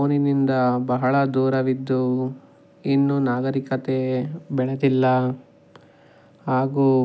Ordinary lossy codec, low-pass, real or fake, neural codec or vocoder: none; none; real; none